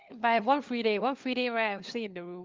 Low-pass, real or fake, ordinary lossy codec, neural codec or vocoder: 7.2 kHz; fake; Opus, 24 kbps; codec, 16 kHz, 4 kbps, FunCodec, trained on LibriTTS, 50 frames a second